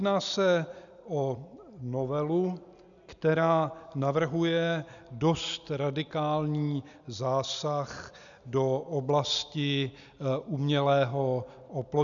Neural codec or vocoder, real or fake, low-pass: none; real; 7.2 kHz